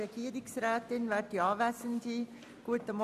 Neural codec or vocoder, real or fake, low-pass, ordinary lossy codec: none; real; 14.4 kHz; none